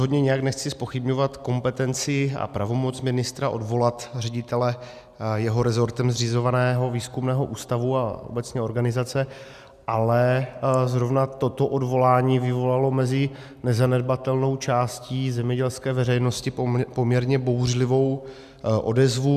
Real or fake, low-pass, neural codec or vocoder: real; 14.4 kHz; none